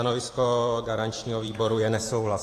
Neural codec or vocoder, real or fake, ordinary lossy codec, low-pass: vocoder, 44.1 kHz, 128 mel bands every 512 samples, BigVGAN v2; fake; AAC, 48 kbps; 14.4 kHz